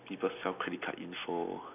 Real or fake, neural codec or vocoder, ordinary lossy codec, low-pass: real; none; none; 3.6 kHz